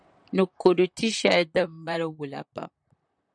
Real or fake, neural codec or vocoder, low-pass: fake; vocoder, 44.1 kHz, 128 mel bands, Pupu-Vocoder; 9.9 kHz